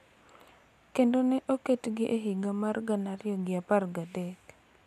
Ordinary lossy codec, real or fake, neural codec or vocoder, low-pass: none; real; none; 14.4 kHz